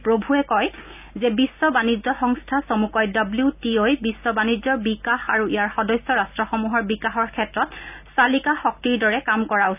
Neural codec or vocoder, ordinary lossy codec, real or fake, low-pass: none; none; real; 3.6 kHz